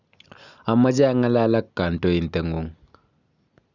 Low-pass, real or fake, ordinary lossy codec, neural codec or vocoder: 7.2 kHz; real; none; none